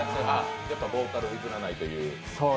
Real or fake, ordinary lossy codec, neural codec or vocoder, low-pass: real; none; none; none